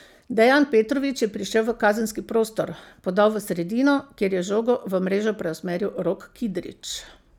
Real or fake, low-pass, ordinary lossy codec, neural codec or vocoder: real; 19.8 kHz; none; none